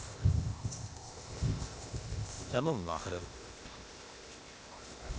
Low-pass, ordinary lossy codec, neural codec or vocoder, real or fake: none; none; codec, 16 kHz, 0.8 kbps, ZipCodec; fake